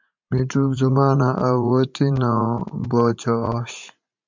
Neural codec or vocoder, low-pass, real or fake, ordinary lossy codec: vocoder, 44.1 kHz, 80 mel bands, Vocos; 7.2 kHz; fake; MP3, 64 kbps